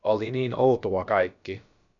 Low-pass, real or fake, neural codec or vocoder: 7.2 kHz; fake; codec, 16 kHz, about 1 kbps, DyCAST, with the encoder's durations